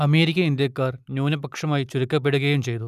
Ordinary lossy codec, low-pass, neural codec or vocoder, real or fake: none; 14.4 kHz; none; real